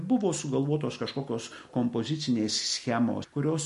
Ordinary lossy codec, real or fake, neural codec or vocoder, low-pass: MP3, 48 kbps; real; none; 14.4 kHz